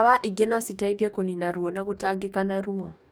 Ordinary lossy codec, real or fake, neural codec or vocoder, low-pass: none; fake; codec, 44.1 kHz, 2.6 kbps, DAC; none